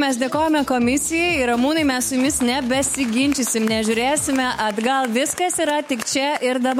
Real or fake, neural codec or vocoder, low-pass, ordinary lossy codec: fake; autoencoder, 48 kHz, 128 numbers a frame, DAC-VAE, trained on Japanese speech; 19.8 kHz; MP3, 64 kbps